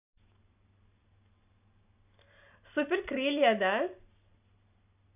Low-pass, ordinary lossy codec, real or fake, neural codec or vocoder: 3.6 kHz; none; real; none